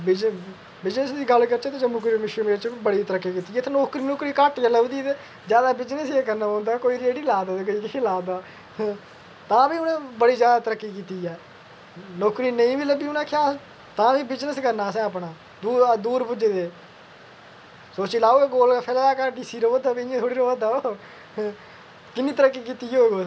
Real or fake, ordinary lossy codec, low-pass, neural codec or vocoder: real; none; none; none